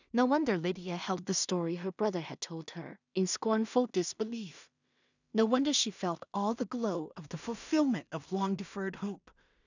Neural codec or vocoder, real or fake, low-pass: codec, 16 kHz in and 24 kHz out, 0.4 kbps, LongCat-Audio-Codec, two codebook decoder; fake; 7.2 kHz